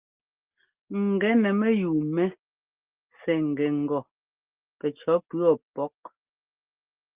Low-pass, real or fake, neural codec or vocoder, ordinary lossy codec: 3.6 kHz; real; none; Opus, 32 kbps